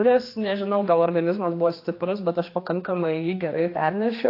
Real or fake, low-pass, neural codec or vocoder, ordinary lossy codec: fake; 5.4 kHz; codec, 16 kHz, 2 kbps, X-Codec, HuBERT features, trained on general audio; AAC, 32 kbps